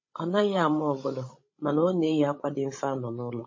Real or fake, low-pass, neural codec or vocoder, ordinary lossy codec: fake; 7.2 kHz; codec, 16 kHz, 16 kbps, FreqCodec, larger model; MP3, 32 kbps